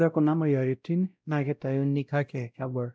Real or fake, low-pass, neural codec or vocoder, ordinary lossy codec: fake; none; codec, 16 kHz, 0.5 kbps, X-Codec, WavLM features, trained on Multilingual LibriSpeech; none